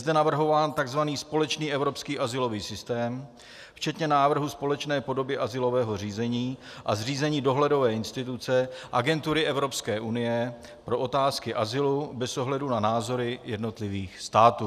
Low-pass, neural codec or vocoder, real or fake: 14.4 kHz; none; real